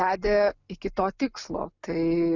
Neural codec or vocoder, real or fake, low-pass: none; real; 7.2 kHz